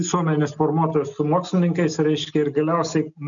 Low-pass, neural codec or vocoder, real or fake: 7.2 kHz; none; real